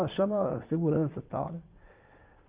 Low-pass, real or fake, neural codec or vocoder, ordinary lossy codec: 3.6 kHz; fake; vocoder, 22.05 kHz, 80 mel bands, WaveNeXt; Opus, 32 kbps